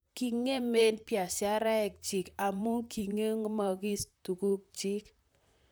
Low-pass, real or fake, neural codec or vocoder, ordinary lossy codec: none; fake; vocoder, 44.1 kHz, 128 mel bands, Pupu-Vocoder; none